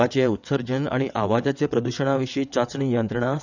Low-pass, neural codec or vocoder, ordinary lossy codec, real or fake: 7.2 kHz; vocoder, 22.05 kHz, 80 mel bands, WaveNeXt; none; fake